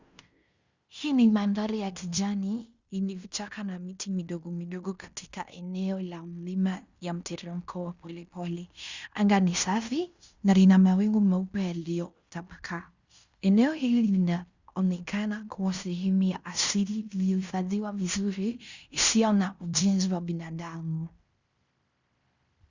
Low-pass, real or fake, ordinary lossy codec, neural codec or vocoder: 7.2 kHz; fake; Opus, 64 kbps; codec, 16 kHz in and 24 kHz out, 0.9 kbps, LongCat-Audio-Codec, fine tuned four codebook decoder